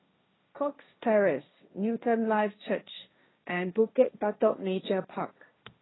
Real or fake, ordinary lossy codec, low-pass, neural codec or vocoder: fake; AAC, 16 kbps; 7.2 kHz; codec, 16 kHz, 1.1 kbps, Voila-Tokenizer